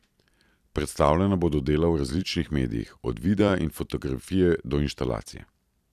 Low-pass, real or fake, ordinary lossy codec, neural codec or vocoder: 14.4 kHz; fake; none; vocoder, 44.1 kHz, 128 mel bands every 256 samples, BigVGAN v2